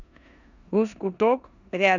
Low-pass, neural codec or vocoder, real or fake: 7.2 kHz; codec, 16 kHz in and 24 kHz out, 0.9 kbps, LongCat-Audio-Codec, four codebook decoder; fake